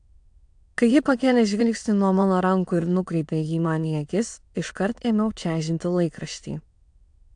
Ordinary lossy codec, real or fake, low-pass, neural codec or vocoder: AAC, 64 kbps; fake; 9.9 kHz; autoencoder, 22.05 kHz, a latent of 192 numbers a frame, VITS, trained on many speakers